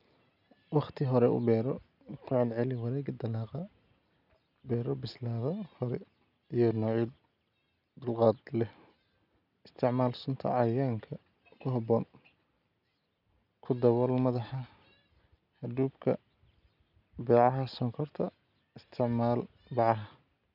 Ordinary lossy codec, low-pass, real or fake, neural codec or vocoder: none; 5.4 kHz; real; none